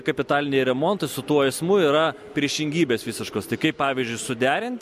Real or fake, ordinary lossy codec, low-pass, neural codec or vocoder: real; MP3, 64 kbps; 14.4 kHz; none